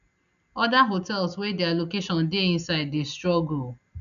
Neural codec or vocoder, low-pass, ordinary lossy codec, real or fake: none; 7.2 kHz; none; real